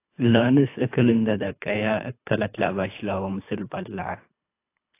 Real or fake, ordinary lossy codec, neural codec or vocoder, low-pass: fake; AAC, 24 kbps; codec, 24 kHz, 3 kbps, HILCodec; 3.6 kHz